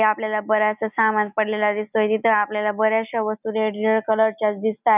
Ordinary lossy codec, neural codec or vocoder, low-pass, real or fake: none; none; 3.6 kHz; real